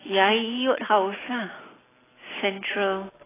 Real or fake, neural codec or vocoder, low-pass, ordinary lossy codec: real; none; 3.6 kHz; AAC, 16 kbps